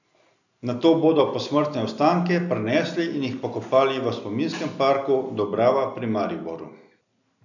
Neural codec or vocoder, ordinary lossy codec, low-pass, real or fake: none; none; 7.2 kHz; real